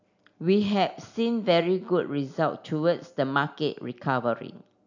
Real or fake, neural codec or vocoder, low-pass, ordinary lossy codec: real; none; 7.2 kHz; none